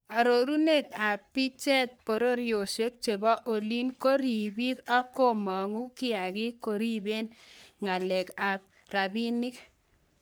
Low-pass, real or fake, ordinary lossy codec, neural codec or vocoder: none; fake; none; codec, 44.1 kHz, 3.4 kbps, Pupu-Codec